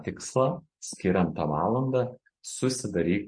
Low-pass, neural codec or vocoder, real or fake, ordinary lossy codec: 9.9 kHz; none; real; MP3, 48 kbps